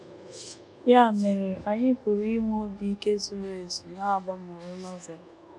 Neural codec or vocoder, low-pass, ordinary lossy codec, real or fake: codec, 24 kHz, 1.2 kbps, DualCodec; none; none; fake